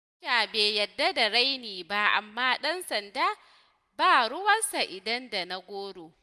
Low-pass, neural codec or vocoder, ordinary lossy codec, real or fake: none; none; none; real